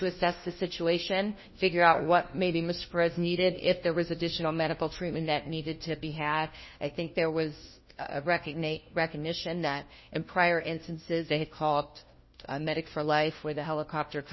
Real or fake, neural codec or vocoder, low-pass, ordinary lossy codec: fake; codec, 16 kHz, 1 kbps, FunCodec, trained on LibriTTS, 50 frames a second; 7.2 kHz; MP3, 24 kbps